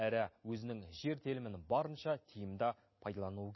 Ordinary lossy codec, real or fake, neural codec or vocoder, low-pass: MP3, 24 kbps; real; none; 7.2 kHz